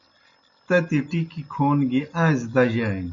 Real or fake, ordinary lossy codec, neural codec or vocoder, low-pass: real; MP3, 48 kbps; none; 7.2 kHz